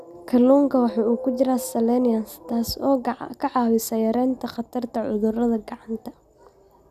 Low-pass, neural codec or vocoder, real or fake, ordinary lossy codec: 14.4 kHz; none; real; none